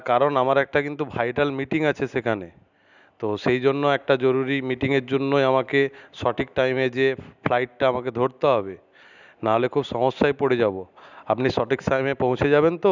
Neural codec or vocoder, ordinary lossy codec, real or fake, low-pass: none; none; real; 7.2 kHz